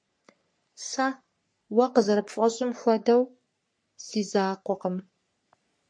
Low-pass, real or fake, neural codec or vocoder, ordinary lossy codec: 9.9 kHz; fake; codec, 44.1 kHz, 3.4 kbps, Pupu-Codec; MP3, 48 kbps